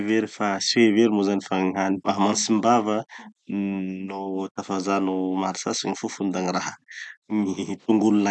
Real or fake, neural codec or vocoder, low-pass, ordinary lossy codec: real; none; none; none